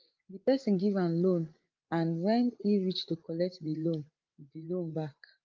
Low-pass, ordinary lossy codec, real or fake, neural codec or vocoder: 7.2 kHz; Opus, 32 kbps; fake; codec, 16 kHz, 8 kbps, FreqCodec, larger model